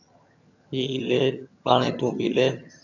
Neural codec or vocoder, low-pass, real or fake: vocoder, 22.05 kHz, 80 mel bands, HiFi-GAN; 7.2 kHz; fake